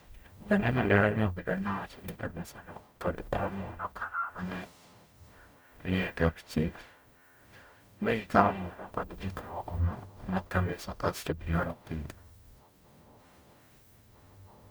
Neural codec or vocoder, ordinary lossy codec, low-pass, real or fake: codec, 44.1 kHz, 0.9 kbps, DAC; none; none; fake